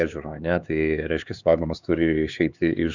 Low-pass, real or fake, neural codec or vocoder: 7.2 kHz; fake; codec, 16 kHz, 4 kbps, X-Codec, HuBERT features, trained on LibriSpeech